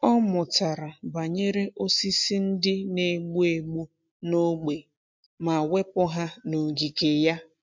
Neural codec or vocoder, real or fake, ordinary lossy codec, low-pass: none; real; MP3, 64 kbps; 7.2 kHz